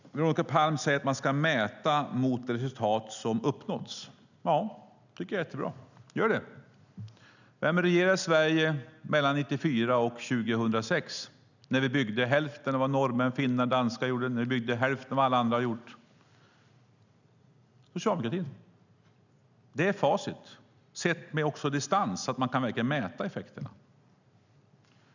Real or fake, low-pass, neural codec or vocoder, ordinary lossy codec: real; 7.2 kHz; none; none